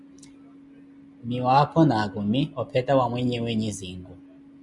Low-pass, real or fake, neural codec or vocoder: 10.8 kHz; real; none